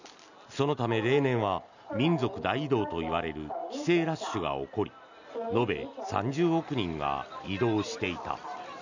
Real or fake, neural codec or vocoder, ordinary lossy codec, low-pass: real; none; none; 7.2 kHz